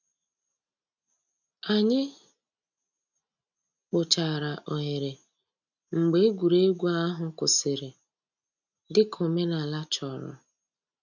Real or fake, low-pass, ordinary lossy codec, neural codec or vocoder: real; 7.2 kHz; none; none